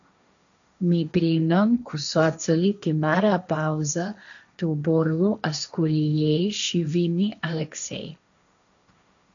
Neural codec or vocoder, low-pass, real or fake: codec, 16 kHz, 1.1 kbps, Voila-Tokenizer; 7.2 kHz; fake